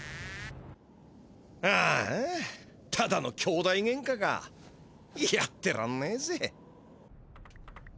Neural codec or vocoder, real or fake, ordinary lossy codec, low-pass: none; real; none; none